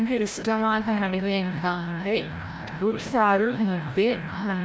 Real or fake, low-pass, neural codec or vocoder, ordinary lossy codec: fake; none; codec, 16 kHz, 0.5 kbps, FreqCodec, larger model; none